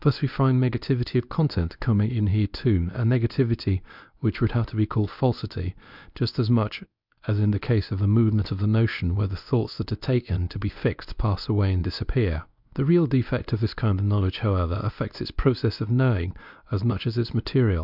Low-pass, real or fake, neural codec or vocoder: 5.4 kHz; fake; codec, 24 kHz, 0.9 kbps, WavTokenizer, medium speech release version 1